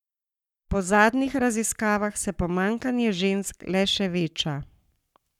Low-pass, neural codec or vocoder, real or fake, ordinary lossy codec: 19.8 kHz; codec, 44.1 kHz, 7.8 kbps, Pupu-Codec; fake; none